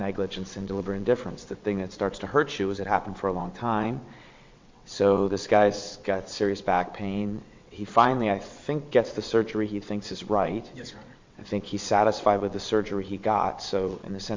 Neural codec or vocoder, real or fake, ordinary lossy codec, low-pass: vocoder, 22.05 kHz, 80 mel bands, WaveNeXt; fake; MP3, 48 kbps; 7.2 kHz